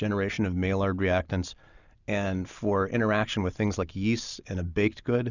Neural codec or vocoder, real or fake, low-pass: none; real; 7.2 kHz